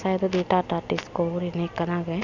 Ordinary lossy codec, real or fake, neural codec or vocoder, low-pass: none; real; none; 7.2 kHz